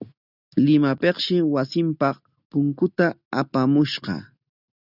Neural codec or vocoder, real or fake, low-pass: none; real; 5.4 kHz